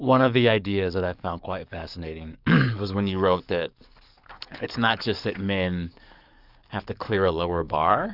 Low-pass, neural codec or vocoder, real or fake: 5.4 kHz; codec, 44.1 kHz, 7.8 kbps, DAC; fake